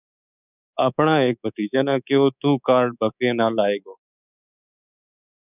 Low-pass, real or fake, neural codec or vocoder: 3.6 kHz; fake; codec, 24 kHz, 3.1 kbps, DualCodec